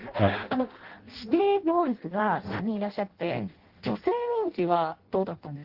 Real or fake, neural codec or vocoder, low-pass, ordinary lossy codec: fake; codec, 16 kHz in and 24 kHz out, 0.6 kbps, FireRedTTS-2 codec; 5.4 kHz; Opus, 16 kbps